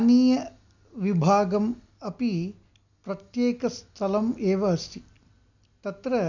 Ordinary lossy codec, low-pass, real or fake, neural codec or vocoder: none; 7.2 kHz; real; none